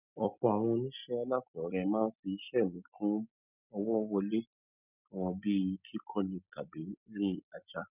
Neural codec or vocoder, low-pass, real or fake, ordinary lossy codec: none; 3.6 kHz; real; none